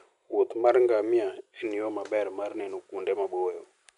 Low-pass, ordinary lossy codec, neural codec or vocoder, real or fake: 10.8 kHz; none; none; real